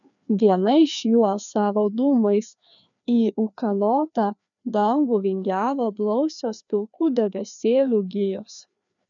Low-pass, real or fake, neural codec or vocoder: 7.2 kHz; fake; codec, 16 kHz, 2 kbps, FreqCodec, larger model